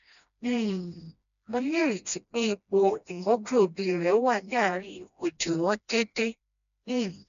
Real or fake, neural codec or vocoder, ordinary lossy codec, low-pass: fake; codec, 16 kHz, 1 kbps, FreqCodec, smaller model; none; 7.2 kHz